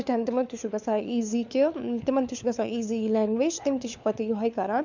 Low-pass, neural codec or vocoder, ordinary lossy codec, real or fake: 7.2 kHz; codec, 16 kHz, 4 kbps, FunCodec, trained on LibriTTS, 50 frames a second; none; fake